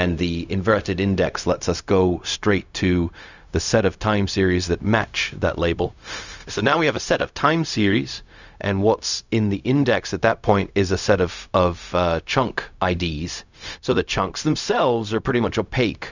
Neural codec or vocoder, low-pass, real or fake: codec, 16 kHz, 0.4 kbps, LongCat-Audio-Codec; 7.2 kHz; fake